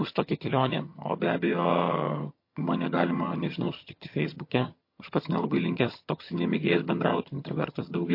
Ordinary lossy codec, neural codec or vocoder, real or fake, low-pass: MP3, 32 kbps; vocoder, 22.05 kHz, 80 mel bands, HiFi-GAN; fake; 5.4 kHz